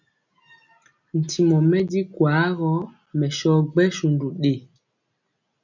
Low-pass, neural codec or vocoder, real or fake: 7.2 kHz; none; real